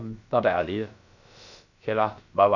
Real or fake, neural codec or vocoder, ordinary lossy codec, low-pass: fake; codec, 16 kHz, about 1 kbps, DyCAST, with the encoder's durations; none; 7.2 kHz